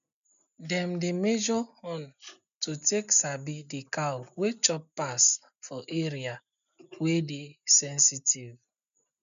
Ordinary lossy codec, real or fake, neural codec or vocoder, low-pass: none; real; none; 7.2 kHz